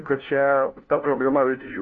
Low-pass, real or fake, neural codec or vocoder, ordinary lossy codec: 7.2 kHz; fake; codec, 16 kHz, 1 kbps, FunCodec, trained on LibriTTS, 50 frames a second; AAC, 32 kbps